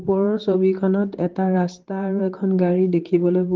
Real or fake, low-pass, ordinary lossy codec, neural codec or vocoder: fake; 7.2 kHz; Opus, 16 kbps; vocoder, 44.1 kHz, 128 mel bands, Pupu-Vocoder